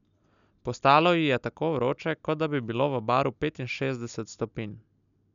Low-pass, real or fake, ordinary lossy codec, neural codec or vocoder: 7.2 kHz; real; none; none